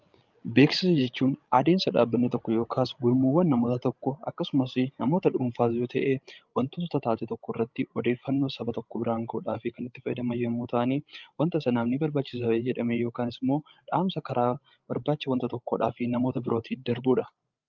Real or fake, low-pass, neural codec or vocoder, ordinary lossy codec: fake; 7.2 kHz; codec, 16 kHz, 16 kbps, FreqCodec, larger model; Opus, 24 kbps